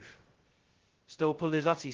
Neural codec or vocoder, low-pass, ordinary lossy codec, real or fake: codec, 16 kHz, 0.2 kbps, FocalCodec; 7.2 kHz; Opus, 16 kbps; fake